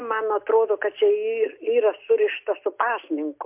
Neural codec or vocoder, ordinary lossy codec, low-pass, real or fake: none; MP3, 32 kbps; 3.6 kHz; real